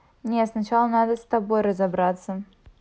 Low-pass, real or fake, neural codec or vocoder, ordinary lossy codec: none; real; none; none